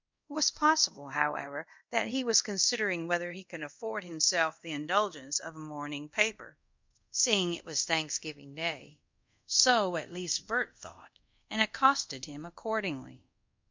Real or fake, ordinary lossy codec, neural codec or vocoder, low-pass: fake; MP3, 64 kbps; codec, 24 kHz, 0.5 kbps, DualCodec; 7.2 kHz